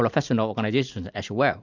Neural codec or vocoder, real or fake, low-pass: none; real; 7.2 kHz